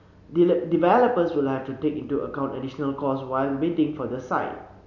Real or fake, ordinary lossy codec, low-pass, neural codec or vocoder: real; none; 7.2 kHz; none